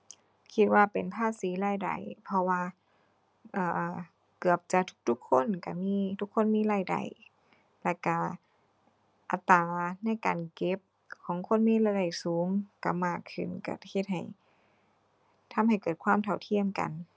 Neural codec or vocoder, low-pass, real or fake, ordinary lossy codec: none; none; real; none